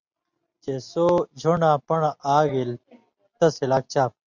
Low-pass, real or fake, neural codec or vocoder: 7.2 kHz; real; none